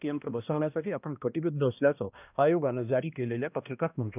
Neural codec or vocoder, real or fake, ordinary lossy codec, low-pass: codec, 16 kHz, 1 kbps, X-Codec, HuBERT features, trained on balanced general audio; fake; none; 3.6 kHz